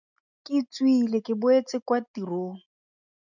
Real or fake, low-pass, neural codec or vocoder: real; 7.2 kHz; none